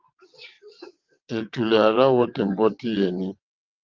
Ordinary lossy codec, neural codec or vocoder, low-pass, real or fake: Opus, 32 kbps; vocoder, 22.05 kHz, 80 mel bands, WaveNeXt; 7.2 kHz; fake